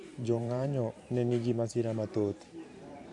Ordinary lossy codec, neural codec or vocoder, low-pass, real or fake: none; vocoder, 44.1 kHz, 128 mel bands every 512 samples, BigVGAN v2; 10.8 kHz; fake